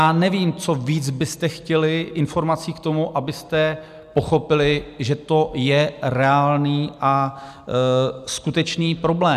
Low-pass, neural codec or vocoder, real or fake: 14.4 kHz; none; real